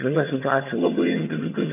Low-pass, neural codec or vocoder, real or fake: 3.6 kHz; vocoder, 22.05 kHz, 80 mel bands, HiFi-GAN; fake